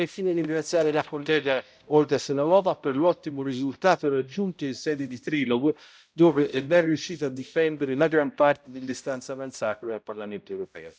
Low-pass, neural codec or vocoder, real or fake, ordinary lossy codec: none; codec, 16 kHz, 0.5 kbps, X-Codec, HuBERT features, trained on balanced general audio; fake; none